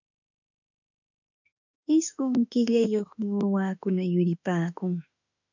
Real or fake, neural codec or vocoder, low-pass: fake; autoencoder, 48 kHz, 32 numbers a frame, DAC-VAE, trained on Japanese speech; 7.2 kHz